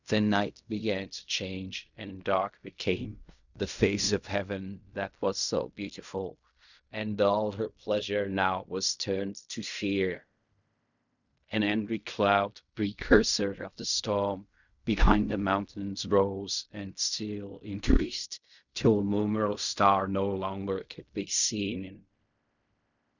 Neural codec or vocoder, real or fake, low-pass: codec, 16 kHz in and 24 kHz out, 0.4 kbps, LongCat-Audio-Codec, fine tuned four codebook decoder; fake; 7.2 kHz